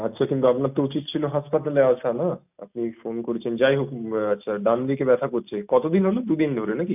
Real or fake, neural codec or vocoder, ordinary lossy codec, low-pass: real; none; none; 3.6 kHz